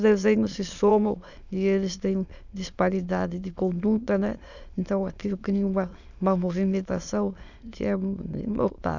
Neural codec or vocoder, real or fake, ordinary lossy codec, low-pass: autoencoder, 22.05 kHz, a latent of 192 numbers a frame, VITS, trained on many speakers; fake; none; 7.2 kHz